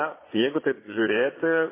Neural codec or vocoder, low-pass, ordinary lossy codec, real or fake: vocoder, 22.05 kHz, 80 mel bands, Vocos; 3.6 kHz; MP3, 16 kbps; fake